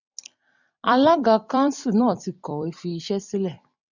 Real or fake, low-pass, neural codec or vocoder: fake; 7.2 kHz; vocoder, 44.1 kHz, 128 mel bands every 256 samples, BigVGAN v2